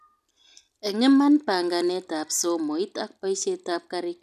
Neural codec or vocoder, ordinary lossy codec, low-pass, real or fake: none; none; 14.4 kHz; real